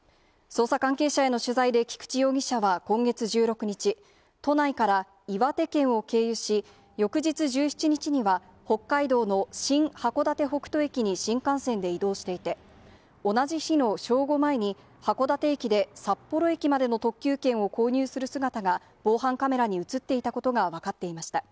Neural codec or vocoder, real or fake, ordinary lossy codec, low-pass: none; real; none; none